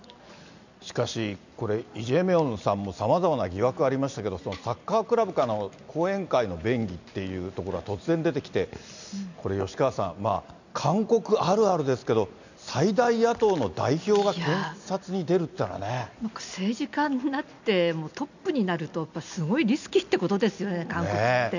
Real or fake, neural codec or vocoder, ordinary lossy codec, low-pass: real; none; none; 7.2 kHz